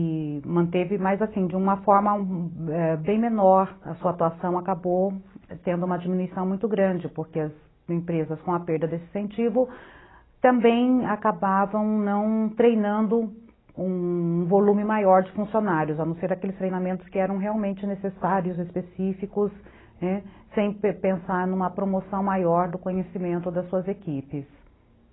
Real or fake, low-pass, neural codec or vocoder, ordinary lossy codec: real; 7.2 kHz; none; AAC, 16 kbps